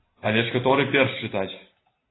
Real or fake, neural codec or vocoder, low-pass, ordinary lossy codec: real; none; 7.2 kHz; AAC, 16 kbps